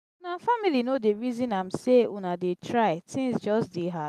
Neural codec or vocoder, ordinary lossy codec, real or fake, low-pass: none; none; real; 14.4 kHz